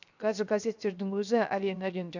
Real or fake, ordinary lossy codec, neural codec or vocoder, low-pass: fake; none; codec, 16 kHz, 0.7 kbps, FocalCodec; 7.2 kHz